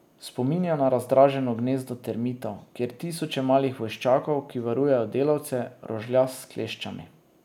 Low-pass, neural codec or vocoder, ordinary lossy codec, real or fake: 19.8 kHz; none; none; real